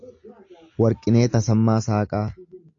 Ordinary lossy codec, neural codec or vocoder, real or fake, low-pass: AAC, 48 kbps; none; real; 7.2 kHz